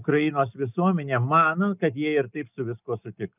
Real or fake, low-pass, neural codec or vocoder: real; 3.6 kHz; none